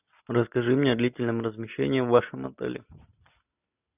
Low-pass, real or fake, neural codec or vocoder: 3.6 kHz; real; none